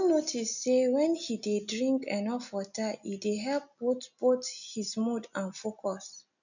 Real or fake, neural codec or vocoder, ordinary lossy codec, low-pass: real; none; none; 7.2 kHz